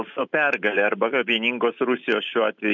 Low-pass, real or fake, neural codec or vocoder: 7.2 kHz; real; none